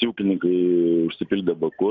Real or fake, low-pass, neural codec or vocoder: real; 7.2 kHz; none